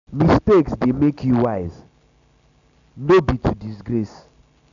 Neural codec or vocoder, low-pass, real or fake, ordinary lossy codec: none; 7.2 kHz; real; none